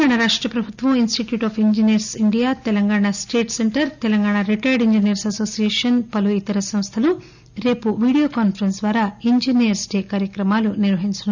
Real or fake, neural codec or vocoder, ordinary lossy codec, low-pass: real; none; none; 7.2 kHz